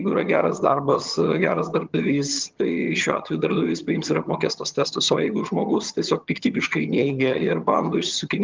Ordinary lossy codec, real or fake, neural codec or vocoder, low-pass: Opus, 32 kbps; fake; vocoder, 22.05 kHz, 80 mel bands, HiFi-GAN; 7.2 kHz